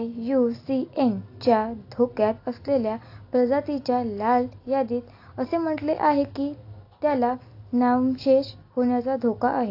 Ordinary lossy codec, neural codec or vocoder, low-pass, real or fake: AAC, 32 kbps; none; 5.4 kHz; real